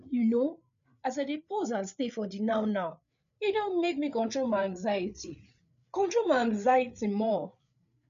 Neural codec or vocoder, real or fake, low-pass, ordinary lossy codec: codec, 16 kHz, 8 kbps, FreqCodec, larger model; fake; 7.2 kHz; none